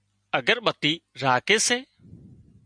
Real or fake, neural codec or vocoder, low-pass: real; none; 9.9 kHz